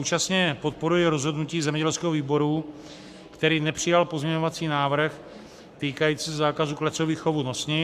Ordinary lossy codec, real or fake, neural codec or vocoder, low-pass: MP3, 96 kbps; fake; codec, 44.1 kHz, 7.8 kbps, Pupu-Codec; 14.4 kHz